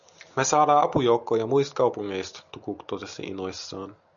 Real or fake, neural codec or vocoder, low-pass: real; none; 7.2 kHz